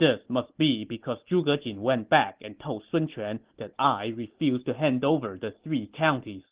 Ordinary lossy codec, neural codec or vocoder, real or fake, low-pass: Opus, 16 kbps; none; real; 3.6 kHz